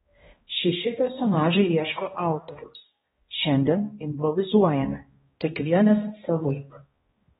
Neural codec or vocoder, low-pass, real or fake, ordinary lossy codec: codec, 16 kHz, 1 kbps, X-Codec, HuBERT features, trained on balanced general audio; 7.2 kHz; fake; AAC, 16 kbps